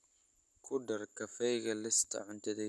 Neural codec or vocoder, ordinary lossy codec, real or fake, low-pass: none; none; real; none